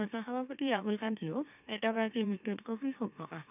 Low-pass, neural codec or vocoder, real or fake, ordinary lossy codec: 3.6 kHz; autoencoder, 44.1 kHz, a latent of 192 numbers a frame, MeloTTS; fake; none